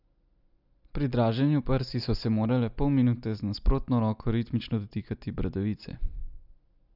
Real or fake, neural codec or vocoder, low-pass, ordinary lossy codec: real; none; 5.4 kHz; none